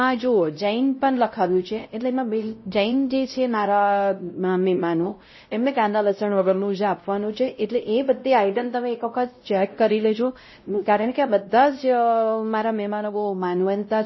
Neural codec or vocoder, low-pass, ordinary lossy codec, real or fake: codec, 16 kHz, 0.5 kbps, X-Codec, WavLM features, trained on Multilingual LibriSpeech; 7.2 kHz; MP3, 24 kbps; fake